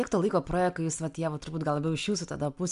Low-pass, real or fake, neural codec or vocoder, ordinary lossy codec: 10.8 kHz; real; none; MP3, 96 kbps